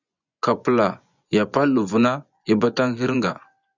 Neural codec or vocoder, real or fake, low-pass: vocoder, 24 kHz, 100 mel bands, Vocos; fake; 7.2 kHz